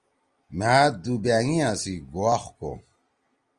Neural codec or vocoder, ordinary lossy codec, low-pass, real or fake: none; Opus, 32 kbps; 9.9 kHz; real